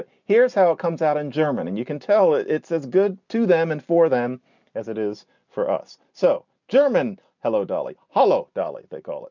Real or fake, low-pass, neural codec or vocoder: real; 7.2 kHz; none